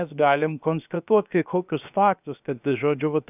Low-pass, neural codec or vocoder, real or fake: 3.6 kHz; codec, 16 kHz, 0.7 kbps, FocalCodec; fake